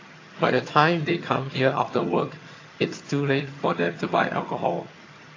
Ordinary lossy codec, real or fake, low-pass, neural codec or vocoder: AAC, 32 kbps; fake; 7.2 kHz; vocoder, 22.05 kHz, 80 mel bands, HiFi-GAN